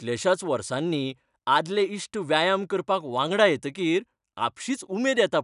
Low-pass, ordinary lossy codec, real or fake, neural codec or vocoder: 10.8 kHz; none; real; none